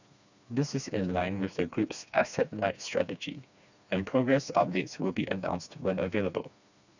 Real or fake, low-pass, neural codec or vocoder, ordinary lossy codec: fake; 7.2 kHz; codec, 16 kHz, 2 kbps, FreqCodec, smaller model; none